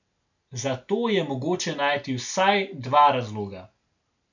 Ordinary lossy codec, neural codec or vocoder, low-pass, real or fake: none; none; 7.2 kHz; real